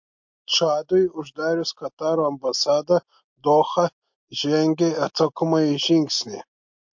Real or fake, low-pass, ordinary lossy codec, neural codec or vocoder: real; 7.2 kHz; MP3, 48 kbps; none